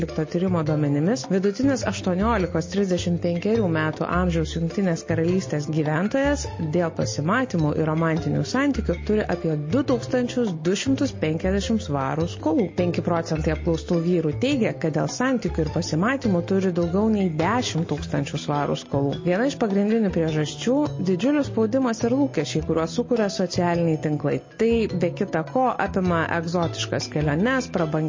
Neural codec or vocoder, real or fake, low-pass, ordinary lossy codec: none; real; 7.2 kHz; MP3, 32 kbps